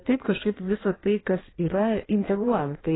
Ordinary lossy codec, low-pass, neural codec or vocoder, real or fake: AAC, 16 kbps; 7.2 kHz; codec, 16 kHz in and 24 kHz out, 1.1 kbps, FireRedTTS-2 codec; fake